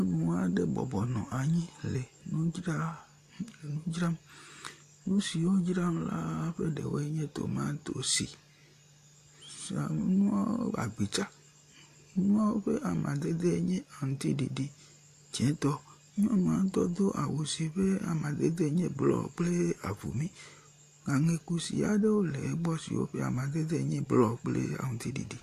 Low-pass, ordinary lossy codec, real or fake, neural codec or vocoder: 14.4 kHz; AAC, 64 kbps; real; none